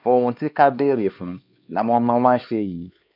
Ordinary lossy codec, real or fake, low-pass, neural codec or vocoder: none; fake; 5.4 kHz; codec, 16 kHz, 2 kbps, X-Codec, HuBERT features, trained on LibriSpeech